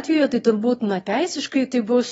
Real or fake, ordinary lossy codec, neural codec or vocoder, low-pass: fake; AAC, 24 kbps; autoencoder, 22.05 kHz, a latent of 192 numbers a frame, VITS, trained on one speaker; 9.9 kHz